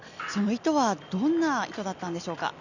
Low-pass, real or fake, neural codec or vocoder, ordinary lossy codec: 7.2 kHz; real; none; none